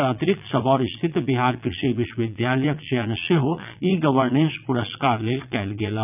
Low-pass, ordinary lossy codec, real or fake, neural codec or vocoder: 3.6 kHz; none; fake; vocoder, 22.05 kHz, 80 mel bands, Vocos